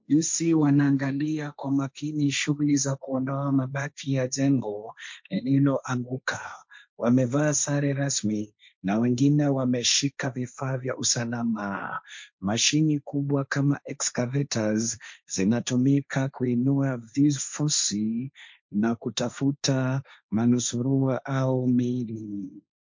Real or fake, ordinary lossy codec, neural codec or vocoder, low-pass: fake; MP3, 48 kbps; codec, 16 kHz, 1.1 kbps, Voila-Tokenizer; 7.2 kHz